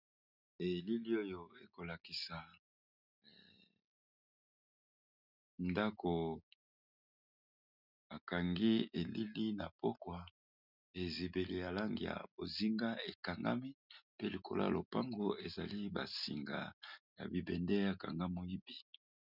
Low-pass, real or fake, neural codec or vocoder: 5.4 kHz; real; none